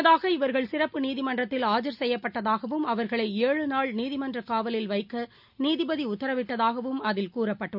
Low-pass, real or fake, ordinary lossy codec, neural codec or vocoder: 5.4 kHz; real; none; none